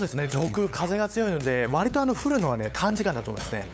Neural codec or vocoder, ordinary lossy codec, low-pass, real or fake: codec, 16 kHz, 8 kbps, FunCodec, trained on LibriTTS, 25 frames a second; none; none; fake